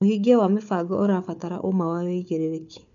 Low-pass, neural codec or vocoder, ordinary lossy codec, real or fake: 7.2 kHz; codec, 16 kHz, 4 kbps, FunCodec, trained on Chinese and English, 50 frames a second; none; fake